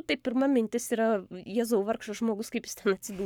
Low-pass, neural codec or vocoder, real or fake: 19.8 kHz; codec, 44.1 kHz, 7.8 kbps, Pupu-Codec; fake